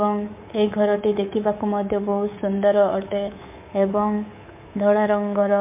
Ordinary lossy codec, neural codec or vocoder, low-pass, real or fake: MP3, 24 kbps; codec, 24 kHz, 3.1 kbps, DualCodec; 3.6 kHz; fake